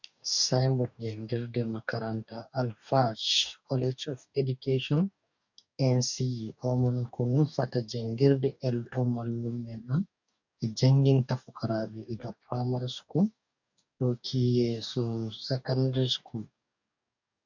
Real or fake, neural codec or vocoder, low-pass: fake; codec, 44.1 kHz, 2.6 kbps, DAC; 7.2 kHz